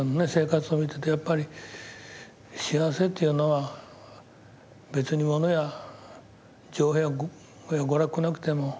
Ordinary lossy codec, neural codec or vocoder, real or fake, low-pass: none; none; real; none